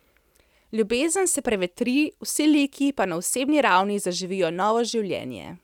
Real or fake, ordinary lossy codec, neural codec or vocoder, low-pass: real; none; none; 19.8 kHz